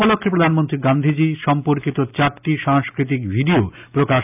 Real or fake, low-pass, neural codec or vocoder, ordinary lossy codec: real; 3.6 kHz; none; none